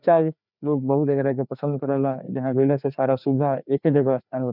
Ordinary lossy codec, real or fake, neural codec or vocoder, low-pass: none; fake; codec, 16 kHz, 2 kbps, FreqCodec, larger model; 5.4 kHz